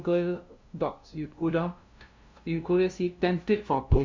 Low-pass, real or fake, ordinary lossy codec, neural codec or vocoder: 7.2 kHz; fake; MP3, 64 kbps; codec, 16 kHz, 0.5 kbps, FunCodec, trained on LibriTTS, 25 frames a second